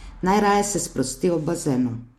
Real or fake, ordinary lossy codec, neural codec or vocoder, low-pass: real; MP3, 64 kbps; none; 19.8 kHz